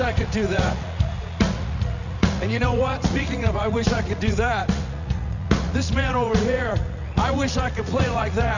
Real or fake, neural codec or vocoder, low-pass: fake; vocoder, 44.1 kHz, 80 mel bands, Vocos; 7.2 kHz